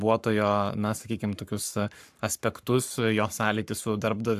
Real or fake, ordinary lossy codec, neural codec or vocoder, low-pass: fake; AAC, 96 kbps; codec, 44.1 kHz, 7.8 kbps, Pupu-Codec; 14.4 kHz